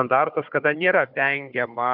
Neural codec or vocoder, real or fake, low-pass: codec, 16 kHz, 4 kbps, FunCodec, trained on Chinese and English, 50 frames a second; fake; 5.4 kHz